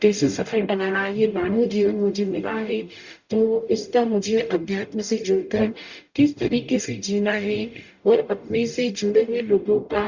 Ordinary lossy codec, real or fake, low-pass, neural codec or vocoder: Opus, 64 kbps; fake; 7.2 kHz; codec, 44.1 kHz, 0.9 kbps, DAC